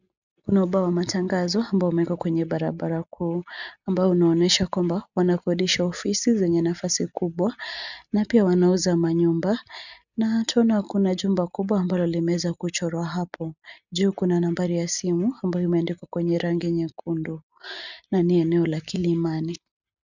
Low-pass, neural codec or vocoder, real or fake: 7.2 kHz; none; real